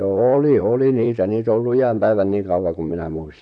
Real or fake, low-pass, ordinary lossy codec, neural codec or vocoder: fake; 9.9 kHz; none; vocoder, 44.1 kHz, 128 mel bands, Pupu-Vocoder